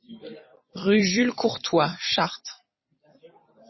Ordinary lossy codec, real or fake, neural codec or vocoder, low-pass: MP3, 24 kbps; fake; vocoder, 44.1 kHz, 128 mel bands every 256 samples, BigVGAN v2; 7.2 kHz